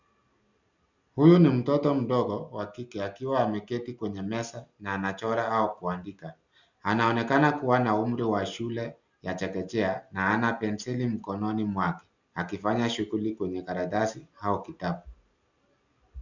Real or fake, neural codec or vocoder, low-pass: real; none; 7.2 kHz